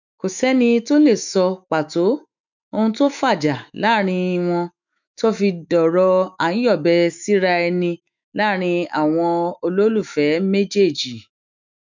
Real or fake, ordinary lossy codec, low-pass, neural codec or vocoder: fake; none; 7.2 kHz; autoencoder, 48 kHz, 128 numbers a frame, DAC-VAE, trained on Japanese speech